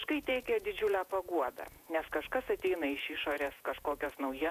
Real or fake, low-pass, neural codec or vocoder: fake; 14.4 kHz; vocoder, 48 kHz, 128 mel bands, Vocos